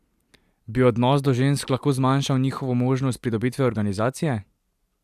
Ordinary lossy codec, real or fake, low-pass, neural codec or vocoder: AAC, 96 kbps; real; 14.4 kHz; none